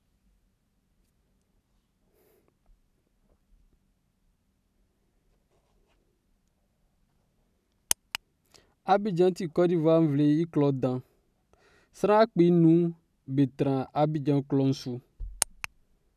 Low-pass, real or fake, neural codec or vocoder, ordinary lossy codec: 14.4 kHz; real; none; none